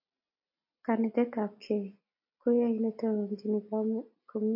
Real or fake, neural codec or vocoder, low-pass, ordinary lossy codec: real; none; 5.4 kHz; MP3, 32 kbps